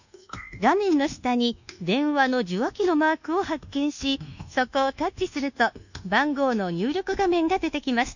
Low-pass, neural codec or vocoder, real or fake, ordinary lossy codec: 7.2 kHz; codec, 24 kHz, 1.2 kbps, DualCodec; fake; none